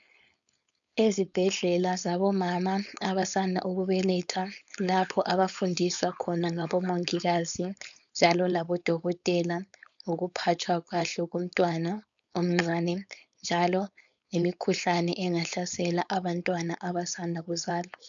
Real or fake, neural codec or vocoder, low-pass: fake; codec, 16 kHz, 4.8 kbps, FACodec; 7.2 kHz